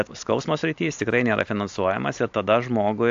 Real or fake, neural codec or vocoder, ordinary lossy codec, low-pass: real; none; AAC, 64 kbps; 7.2 kHz